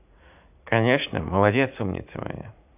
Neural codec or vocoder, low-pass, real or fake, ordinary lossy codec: vocoder, 44.1 kHz, 80 mel bands, Vocos; 3.6 kHz; fake; none